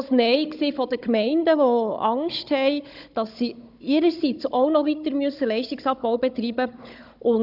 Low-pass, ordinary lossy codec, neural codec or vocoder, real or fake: 5.4 kHz; none; codec, 16 kHz, 16 kbps, FunCodec, trained on Chinese and English, 50 frames a second; fake